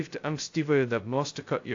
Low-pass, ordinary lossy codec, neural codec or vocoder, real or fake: 7.2 kHz; AAC, 48 kbps; codec, 16 kHz, 0.2 kbps, FocalCodec; fake